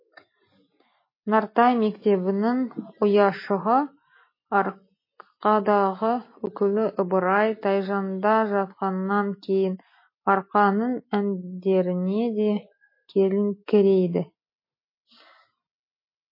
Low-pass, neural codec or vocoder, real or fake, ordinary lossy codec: 5.4 kHz; none; real; MP3, 24 kbps